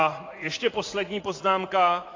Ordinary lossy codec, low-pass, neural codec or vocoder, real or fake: AAC, 32 kbps; 7.2 kHz; vocoder, 44.1 kHz, 80 mel bands, Vocos; fake